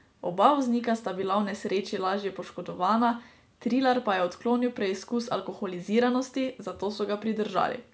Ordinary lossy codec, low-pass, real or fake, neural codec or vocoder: none; none; real; none